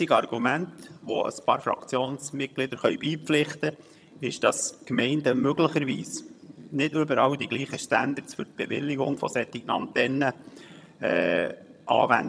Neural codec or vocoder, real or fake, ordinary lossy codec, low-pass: vocoder, 22.05 kHz, 80 mel bands, HiFi-GAN; fake; none; none